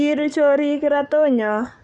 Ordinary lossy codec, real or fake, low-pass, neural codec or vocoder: none; fake; 10.8 kHz; codec, 44.1 kHz, 7.8 kbps, DAC